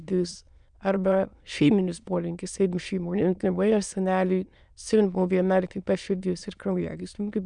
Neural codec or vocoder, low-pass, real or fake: autoencoder, 22.05 kHz, a latent of 192 numbers a frame, VITS, trained on many speakers; 9.9 kHz; fake